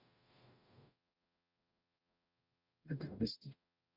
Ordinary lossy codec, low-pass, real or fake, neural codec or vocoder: none; 5.4 kHz; fake; codec, 44.1 kHz, 0.9 kbps, DAC